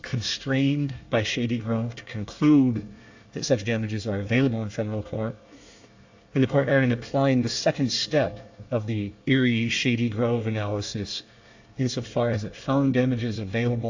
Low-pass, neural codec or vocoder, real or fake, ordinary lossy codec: 7.2 kHz; codec, 24 kHz, 1 kbps, SNAC; fake; AAC, 48 kbps